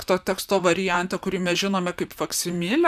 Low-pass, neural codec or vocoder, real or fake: 14.4 kHz; vocoder, 44.1 kHz, 128 mel bands, Pupu-Vocoder; fake